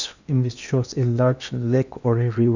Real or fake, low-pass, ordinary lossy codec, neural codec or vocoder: fake; 7.2 kHz; none; codec, 16 kHz in and 24 kHz out, 0.8 kbps, FocalCodec, streaming, 65536 codes